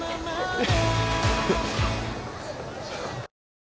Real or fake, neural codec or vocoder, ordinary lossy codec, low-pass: real; none; none; none